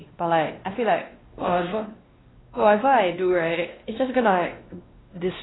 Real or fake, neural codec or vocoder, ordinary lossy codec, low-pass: fake; codec, 16 kHz, 1 kbps, X-Codec, WavLM features, trained on Multilingual LibriSpeech; AAC, 16 kbps; 7.2 kHz